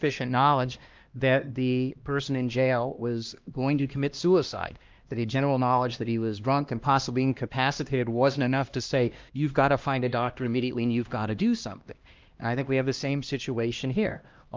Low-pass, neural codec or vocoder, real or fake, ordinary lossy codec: 7.2 kHz; codec, 16 kHz, 1 kbps, X-Codec, HuBERT features, trained on LibriSpeech; fake; Opus, 24 kbps